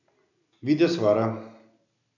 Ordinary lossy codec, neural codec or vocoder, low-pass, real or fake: none; none; 7.2 kHz; real